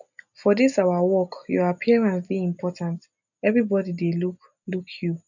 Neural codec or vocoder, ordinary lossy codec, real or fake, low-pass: none; none; real; 7.2 kHz